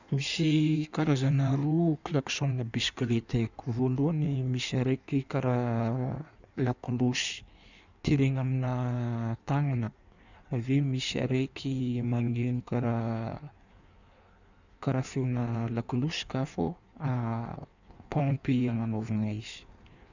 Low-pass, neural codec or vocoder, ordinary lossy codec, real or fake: 7.2 kHz; codec, 16 kHz in and 24 kHz out, 1.1 kbps, FireRedTTS-2 codec; none; fake